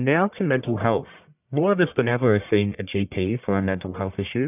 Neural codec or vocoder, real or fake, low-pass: codec, 44.1 kHz, 1.7 kbps, Pupu-Codec; fake; 3.6 kHz